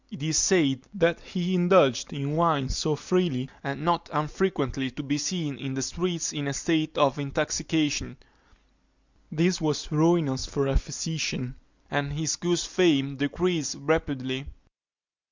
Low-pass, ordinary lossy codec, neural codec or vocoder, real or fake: 7.2 kHz; Opus, 64 kbps; none; real